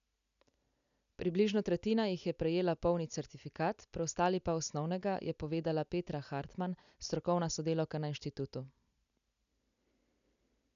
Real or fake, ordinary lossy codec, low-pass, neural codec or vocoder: real; none; 7.2 kHz; none